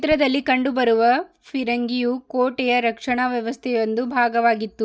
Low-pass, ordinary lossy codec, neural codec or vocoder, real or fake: none; none; none; real